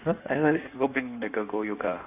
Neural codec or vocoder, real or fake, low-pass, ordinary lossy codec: codec, 16 kHz in and 24 kHz out, 1.1 kbps, FireRedTTS-2 codec; fake; 3.6 kHz; none